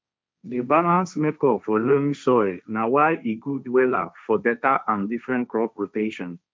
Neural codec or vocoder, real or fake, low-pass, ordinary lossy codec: codec, 16 kHz, 1.1 kbps, Voila-Tokenizer; fake; none; none